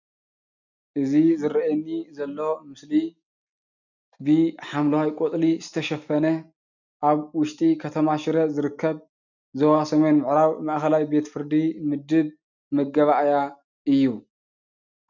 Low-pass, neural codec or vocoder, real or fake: 7.2 kHz; none; real